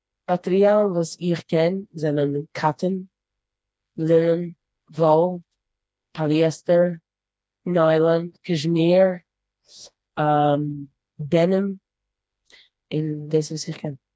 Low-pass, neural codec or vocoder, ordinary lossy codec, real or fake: none; codec, 16 kHz, 2 kbps, FreqCodec, smaller model; none; fake